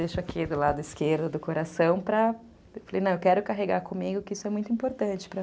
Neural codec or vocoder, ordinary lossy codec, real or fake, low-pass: none; none; real; none